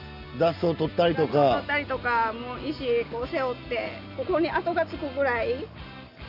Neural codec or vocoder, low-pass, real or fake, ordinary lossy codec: none; 5.4 kHz; real; none